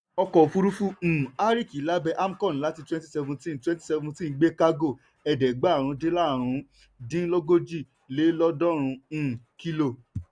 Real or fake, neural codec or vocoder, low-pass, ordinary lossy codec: real; none; 9.9 kHz; none